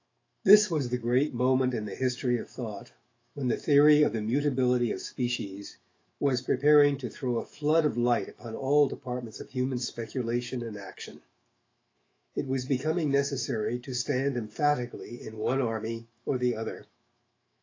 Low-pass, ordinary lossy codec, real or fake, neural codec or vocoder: 7.2 kHz; AAC, 32 kbps; fake; autoencoder, 48 kHz, 128 numbers a frame, DAC-VAE, trained on Japanese speech